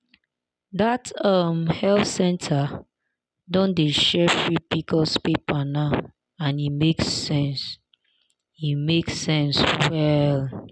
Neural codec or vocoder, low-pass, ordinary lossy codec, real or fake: none; none; none; real